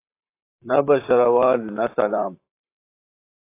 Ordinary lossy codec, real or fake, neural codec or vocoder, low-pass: AAC, 24 kbps; fake; vocoder, 44.1 kHz, 128 mel bands, Pupu-Vocoder; 3.6 kHz